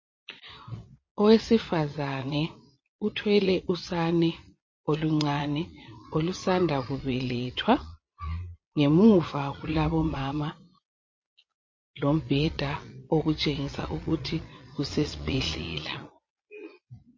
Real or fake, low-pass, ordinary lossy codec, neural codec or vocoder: fake; 7.2 kHz; MP3, 32 kbps; vocoder, 22.05 kHz, 80 mel bands, Vocos